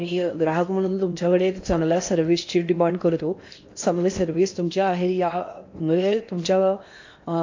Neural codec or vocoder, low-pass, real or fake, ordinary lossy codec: codec, 16 kHz in and 24 kHz out, 0.8 kbps, FocalCodec, streaming, 65536 codes; 7.2 kHz; fake; AAC, 48 kbps